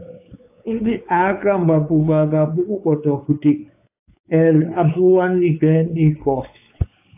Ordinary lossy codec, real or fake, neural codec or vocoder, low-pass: AAC, 32 kbps; fake; codec, 16 kHz, 4 kbps, X-Codec, WavLM features, trained on Multilingual LibriSpeech; 3.6 kHz